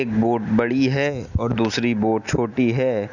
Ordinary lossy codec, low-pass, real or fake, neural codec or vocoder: none; 7.2 kHz; real; none